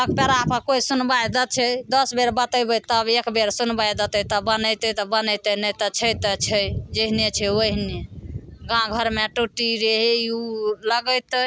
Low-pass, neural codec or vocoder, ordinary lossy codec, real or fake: none; none; none; real